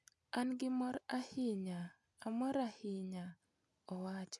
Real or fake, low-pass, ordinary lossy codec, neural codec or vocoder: real; none; none; none